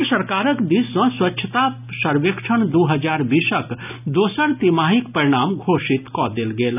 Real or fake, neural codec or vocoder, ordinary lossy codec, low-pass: real; none; none; 3.6 kHz